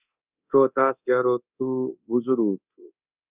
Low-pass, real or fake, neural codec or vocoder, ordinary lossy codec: 3.6 kHz; fake; codec, 24 kHz, 0.9 kbps, DualCodec; Opus, 64 kbps